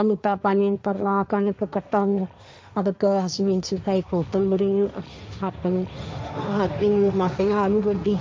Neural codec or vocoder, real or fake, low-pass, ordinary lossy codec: codec, 16 kHz, 1.1 kbps, Voila-Tokenizer; fake; none; none